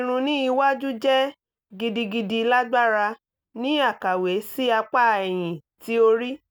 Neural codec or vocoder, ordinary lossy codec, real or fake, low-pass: none; none; real; none